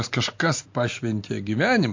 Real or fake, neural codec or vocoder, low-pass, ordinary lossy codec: real; none; 7.2 kHz; MP3, 48 kbps